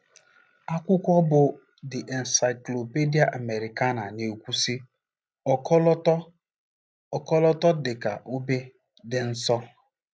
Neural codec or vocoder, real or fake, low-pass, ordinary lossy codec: none; real; none; none